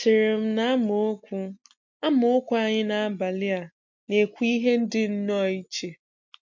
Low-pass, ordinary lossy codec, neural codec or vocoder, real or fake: 7.2 kHz; MP3, 48 kbps; none; real